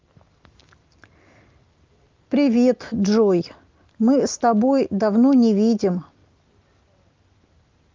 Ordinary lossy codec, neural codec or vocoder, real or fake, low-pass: Opus, 32 kbps; none; real; 7.2 kHz